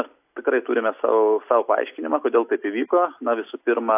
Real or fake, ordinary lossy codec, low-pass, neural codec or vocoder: real; AAC, 32 kbps; 3.6 kHz; none